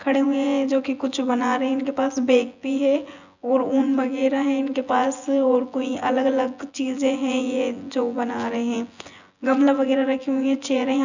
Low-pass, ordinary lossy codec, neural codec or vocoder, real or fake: 7.2 kHz; none; vocoder, 24 kHz, 100 mel bands, Vocos; fake